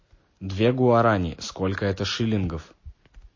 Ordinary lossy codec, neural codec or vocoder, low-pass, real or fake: MP3, 32 kbps; none; 7.2 kHz; real